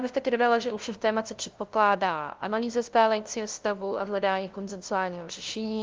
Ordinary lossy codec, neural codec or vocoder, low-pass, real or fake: Opus, 32 kbps; codec, 16 kHz, 0.5 kbps, FunCodec, trained on LibriTTS, 25 frames a second; 7.2 kHz; fake